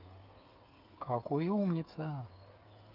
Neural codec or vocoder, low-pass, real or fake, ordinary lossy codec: codec, 16 kHz, 8 kbps, FreqCodec, smaller model; 5.4 kHz; fake; Opus, 24 kbps